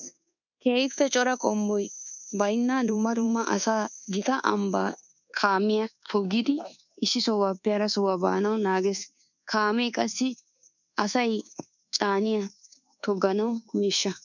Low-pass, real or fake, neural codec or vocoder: 7.2 kHz; fake; codec, 24 kHz, 1.2 kbps, DualCodec